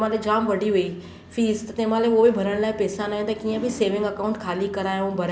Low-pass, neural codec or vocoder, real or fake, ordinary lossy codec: none; none; real; none